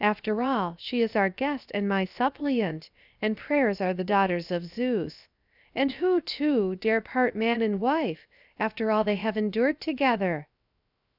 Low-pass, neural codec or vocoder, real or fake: 5.4 kHz; codec, 16 kHz, 0.7 kbps, FocalCodec; fake